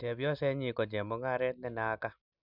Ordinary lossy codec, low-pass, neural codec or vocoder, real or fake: none; 5.4 kHz; none; real